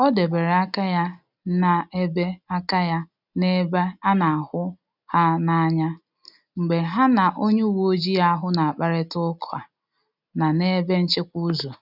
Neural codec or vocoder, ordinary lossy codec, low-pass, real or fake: none; none; 5.4 kHz; real